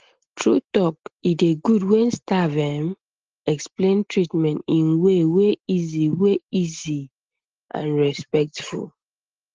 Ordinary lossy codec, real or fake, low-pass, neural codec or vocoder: Opus, 16 kbps; real; 7.2 kHz; none